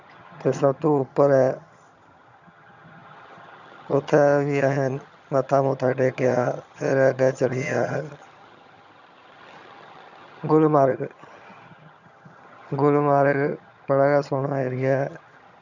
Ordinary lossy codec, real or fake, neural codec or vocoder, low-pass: none; fake; vocoder, 22.05 kHz, 80 mel bands, HiFi-GAN; 7.2 kHz